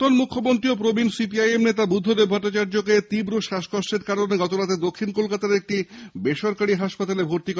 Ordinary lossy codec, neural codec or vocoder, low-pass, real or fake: none; none; none; real